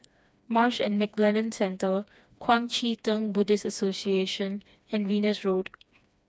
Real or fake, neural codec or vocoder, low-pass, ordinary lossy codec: fake; codec, 16 kHz, 2 kbps, FreqCodec, smaller model; none; none